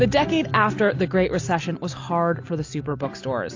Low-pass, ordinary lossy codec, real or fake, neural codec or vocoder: 7.2 kHz; AAC, 48 kbps; real; none